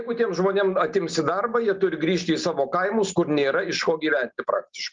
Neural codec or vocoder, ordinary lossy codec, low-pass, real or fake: none; Opus, 32 kbps; 7.2 kHz; real